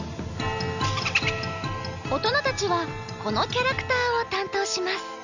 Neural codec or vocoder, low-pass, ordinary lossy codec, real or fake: none; 7.2 kHz; none; real